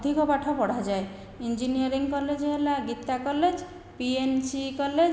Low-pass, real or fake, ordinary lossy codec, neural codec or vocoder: none; real; none; none